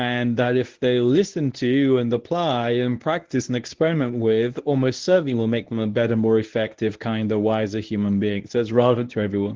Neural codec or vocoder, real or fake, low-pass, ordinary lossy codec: codec, 24 kHz, 0.9 kbps, WavTokenizer, medium speech release version 1; fake; 7.2 kHz; Opus, 16 kbps